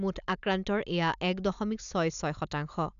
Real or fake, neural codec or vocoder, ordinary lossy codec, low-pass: real; none; none; 7.2 kHz